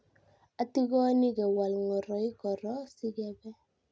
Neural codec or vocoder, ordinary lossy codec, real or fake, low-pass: none; none; real; none